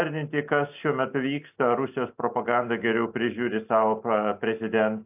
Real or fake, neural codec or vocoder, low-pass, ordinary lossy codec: real; none; 3.6 kHz; AAC, 32 kbps